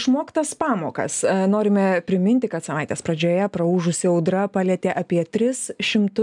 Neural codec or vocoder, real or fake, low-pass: none; real; 10.8 kHz